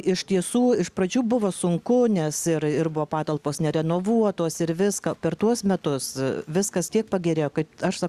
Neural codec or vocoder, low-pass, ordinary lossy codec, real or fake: none; 14.4 kHz; Opus, 64 kbps; real